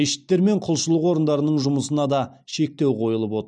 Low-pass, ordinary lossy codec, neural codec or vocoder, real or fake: 9.9 kHz; none; none; real